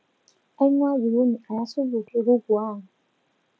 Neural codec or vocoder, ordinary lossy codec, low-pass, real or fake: none; none; none; real